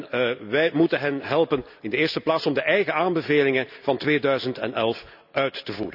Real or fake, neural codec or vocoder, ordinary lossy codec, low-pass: real; none; none; 5.4 kHz